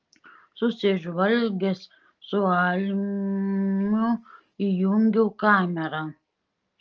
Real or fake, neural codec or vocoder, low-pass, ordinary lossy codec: real; none; 7.2 kHz; Opus, 24 kbps